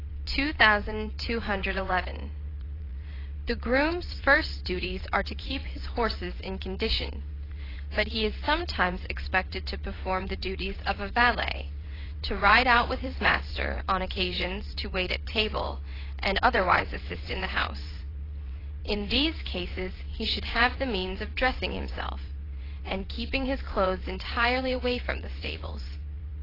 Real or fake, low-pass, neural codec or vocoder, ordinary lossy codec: fake; 5.4 kHz; vocoder, 22.05 kHz, 80 mel bands, WaveNeXt; AAC, 24 kbps